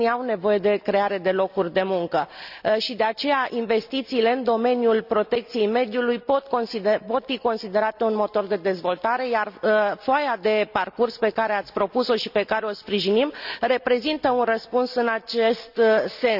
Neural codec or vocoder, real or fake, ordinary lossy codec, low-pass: none; real; none; 5.4 kHz